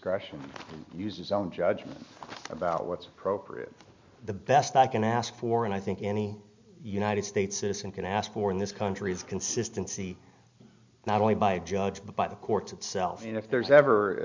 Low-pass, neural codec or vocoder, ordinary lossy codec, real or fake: 7.2 kHz; none; MP3, 64 kbps; real